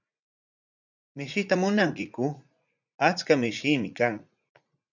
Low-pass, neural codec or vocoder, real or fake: 7.2 kHz; vocoder, 44.1 kHz, 80 mel bands, Vocos; fake